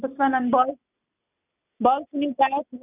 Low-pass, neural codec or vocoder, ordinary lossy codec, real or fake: 3.6 kHz; none; none; real